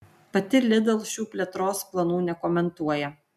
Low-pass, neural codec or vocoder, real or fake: 14.4 kHz; none; real